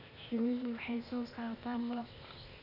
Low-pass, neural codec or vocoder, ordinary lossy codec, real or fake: 5.4 kHz; codec, 16 kHz, 0.8 kbps, ZipCodec; none; fake